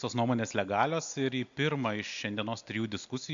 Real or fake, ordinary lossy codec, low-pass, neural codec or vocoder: real; MP3, 64 kbps; 7.2 kHz; none